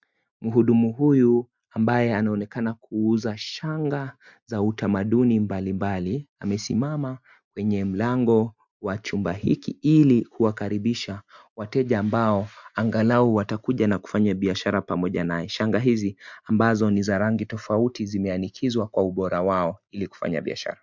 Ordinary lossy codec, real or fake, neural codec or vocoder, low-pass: MP3, 64 kbps; real; none; 7.2 kHz